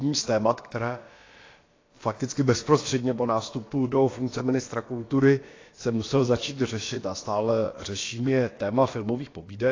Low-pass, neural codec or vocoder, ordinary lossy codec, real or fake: 7.2 kHz; codec, 16 kHz, about 1 kbps, DyCAST, with the encoder's durations; AAC, 32 kbps; fake